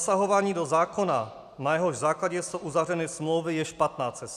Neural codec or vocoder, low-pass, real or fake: none; 14.4 kHz; real